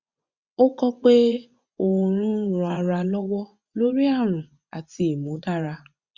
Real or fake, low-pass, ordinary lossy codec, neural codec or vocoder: fake; 7.2 kHz; Opus, 64 kbps; vocoder, 24 kHz, 100 mel bands, Vocos